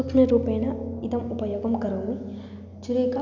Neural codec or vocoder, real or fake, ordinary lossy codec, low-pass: none; real; none; 7.2 kHz